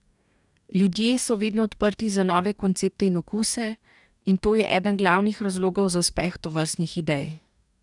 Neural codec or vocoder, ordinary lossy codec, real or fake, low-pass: codec, 44.1 kHz, 2.6 kbps, DAC; none; fake; 10.8 kHz